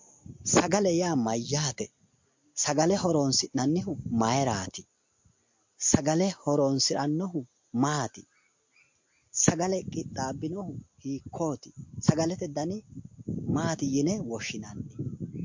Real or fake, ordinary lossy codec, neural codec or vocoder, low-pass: real; MP3, 48 kbps; none; 7.2 kHz